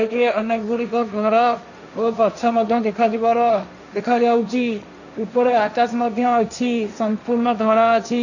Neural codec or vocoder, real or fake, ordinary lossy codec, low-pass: codec, 16 kHz, 1.1 kbps, Voila-Tokenizer; fake; none; 7.2 kHz